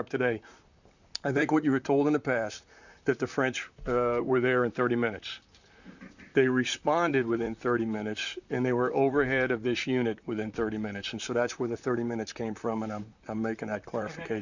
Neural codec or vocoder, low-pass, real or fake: vocoder, 44.1 kHz, 128 mel bands, Pupu-Vocoder; 7.2 kHz; fake